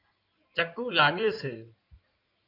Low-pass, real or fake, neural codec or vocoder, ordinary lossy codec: 5.4 kHz; fake; codec, 16 kHz in and 24 kHz out, 2.2 kbps, FireRedTTS-2 codec; AAC, 32 kbps